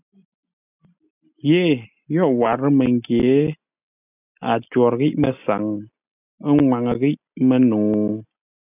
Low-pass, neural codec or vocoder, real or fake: 3.6 kHz; none; real